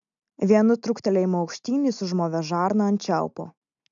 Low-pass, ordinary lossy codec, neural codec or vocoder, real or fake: 7.2 kHz; MP3, 64 kbps; none; real